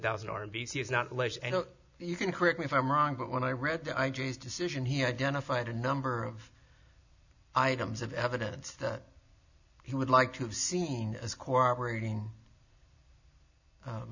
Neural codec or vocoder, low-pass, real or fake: none; 7.2 kHz; real